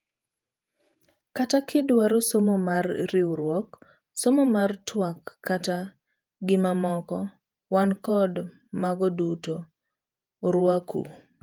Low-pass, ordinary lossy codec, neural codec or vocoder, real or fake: 19.8 kHz; Opus, 32 kbps; vocoder, 48 kHz, 128 mel bands, Vocos; fake